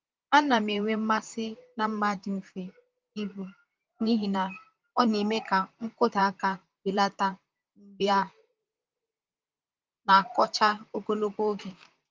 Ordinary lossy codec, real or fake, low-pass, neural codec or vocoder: Opus, 32 kbps; fake; 7.2 kHz; vocoder, 44.1 kHz, 128 mel bands, Pupu-Vocoder